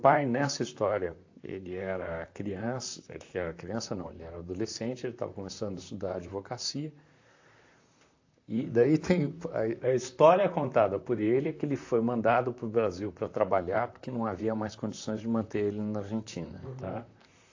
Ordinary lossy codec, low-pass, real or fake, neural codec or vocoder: AAC, 48 kbps; 7.2 kHz; fake; vocoder, 44.1 kHz, 128 mel bands, Pupu-Vocoder